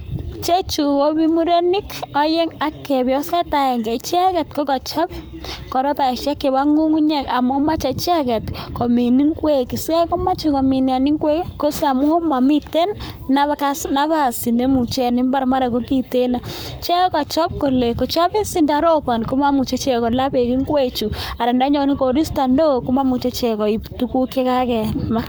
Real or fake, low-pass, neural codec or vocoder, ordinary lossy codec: fake; none; codec, 44.1 kHz, 7.8 kbps, Pupu-Codec; none